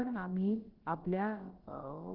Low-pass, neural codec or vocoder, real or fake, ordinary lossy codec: 5.4 kHz; codec, 16 kHz, about 1 kbps, DyCAST, with the encoder's durations; fake; Opus, 16 kbps